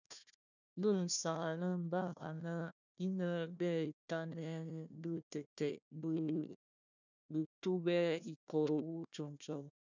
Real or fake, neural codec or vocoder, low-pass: fake; codec, 16 kHz, 1 kbps, FunCodec, trained on Chinese and English, 50 frames a second; 7.2 kHz